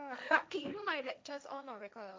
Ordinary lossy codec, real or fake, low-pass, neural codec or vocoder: none; fake; none; codec, 16 kHz, 1.1 kbps, Voila-Tokenizer